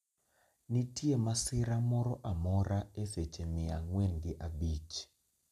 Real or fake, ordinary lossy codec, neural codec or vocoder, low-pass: real; none; none; 14.4 kHz